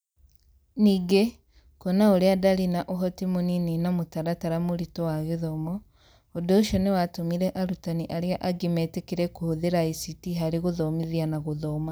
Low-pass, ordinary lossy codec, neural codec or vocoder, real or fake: none; none; none; real